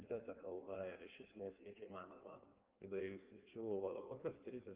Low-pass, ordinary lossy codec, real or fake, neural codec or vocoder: 3.6 kHz; Opus, 24 kbps; fake; codec, 16 kHz in and 24 kHz out, 1.1 kbps, FireRedTTS-2 codec